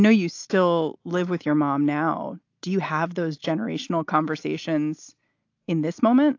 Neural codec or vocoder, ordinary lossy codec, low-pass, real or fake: none; AAC, 48 kbps; 7.2 kHz; real